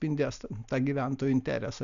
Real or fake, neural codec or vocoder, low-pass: real; none; 7.2 kHz